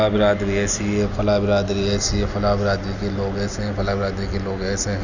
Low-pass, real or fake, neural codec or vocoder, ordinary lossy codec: 7.2 kHz; real; none; none